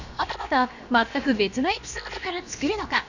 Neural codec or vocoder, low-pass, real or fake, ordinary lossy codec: codec, 16 kHz, about 1 kbps, DyCAST, with the encoder's durations; 7.2 kHz; fake; none